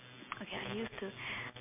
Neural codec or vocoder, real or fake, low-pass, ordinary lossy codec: none; real; 3.6 kHz; MP3, 24 kbps